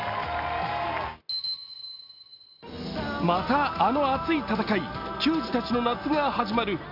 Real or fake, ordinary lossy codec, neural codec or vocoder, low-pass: real; none; none; 5.4 kHz